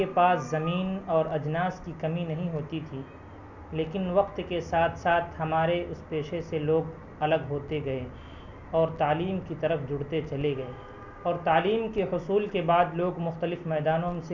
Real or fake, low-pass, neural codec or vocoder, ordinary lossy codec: real; 7.2 kHz; none; none